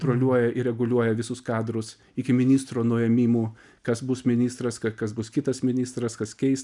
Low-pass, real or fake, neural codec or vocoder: 10.8 kHz; real; none